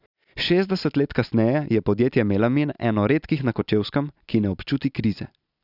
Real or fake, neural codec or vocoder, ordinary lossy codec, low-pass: real; none; none; 5.4 kHz